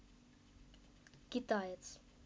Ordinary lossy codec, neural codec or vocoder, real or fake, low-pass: none; none; real; none